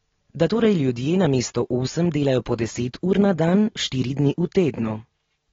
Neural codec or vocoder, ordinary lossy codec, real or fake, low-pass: none; AAC, 24 kbps; real; 7.2 kHz